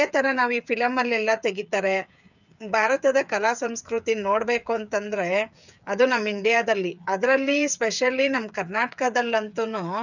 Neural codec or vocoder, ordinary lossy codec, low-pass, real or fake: codec, 16 kHz, 8 kbps, FreqCodec, smaller model; none; 7.2 kHz; fake